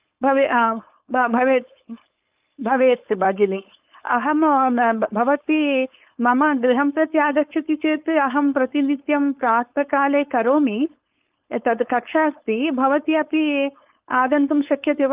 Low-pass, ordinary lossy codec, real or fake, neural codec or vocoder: 3.6 kHz; Opus, 24 kbps; fake; codec, 16 kHz, 4.8 kbps, FACodec